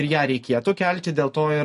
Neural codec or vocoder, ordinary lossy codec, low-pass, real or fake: none; MP3, 48 kbps; 14.4 kHz; real